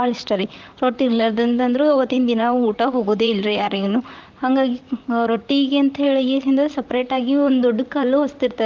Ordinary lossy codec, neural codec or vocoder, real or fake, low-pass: Opus, 32 kbps; vocoder, 44.1 kHz, 128 mel bands, Pupu-Vocoder; fake; 7.2 kHz